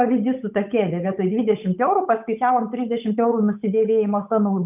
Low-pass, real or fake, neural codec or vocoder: 3.6 kHz; fake; codec, 16 kHz, 8 kbps, FunCodec, trained on Chinese and English, 25 frames a second